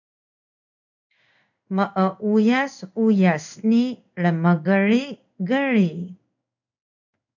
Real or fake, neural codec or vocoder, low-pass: fake; codec, 16 kHz in and 24 kHz out, 1 kbps, XY-Tokenizer; 7.2 kHz